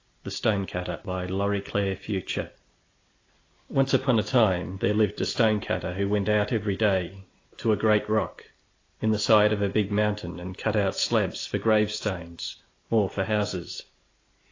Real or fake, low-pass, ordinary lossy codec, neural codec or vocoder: real; 7.2 kHz; AAC, 32 kbps; none